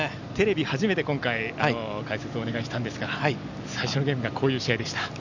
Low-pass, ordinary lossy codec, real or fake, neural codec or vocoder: 7.2 kHz; none; real; none